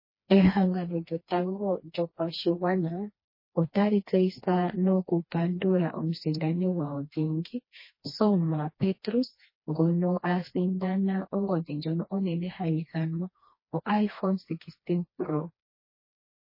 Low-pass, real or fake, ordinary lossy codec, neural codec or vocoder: 5.4 kHz; fake; MP3, 24 kbps; codec, 16 kHz, 2 kbps, FreqCodec, smaller model